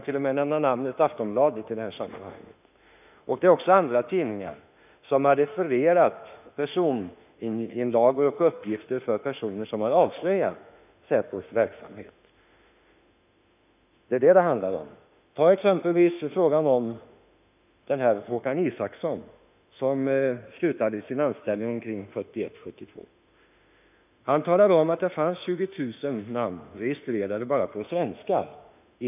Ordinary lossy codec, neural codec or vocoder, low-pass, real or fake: none; autoencoder, 48 kHz, 32 numbers a frame, DAC-VAE, trained on Japanese speech; 3.6 kHz; fake